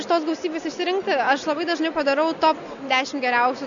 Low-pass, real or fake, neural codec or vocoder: 7.2 kHz; real; none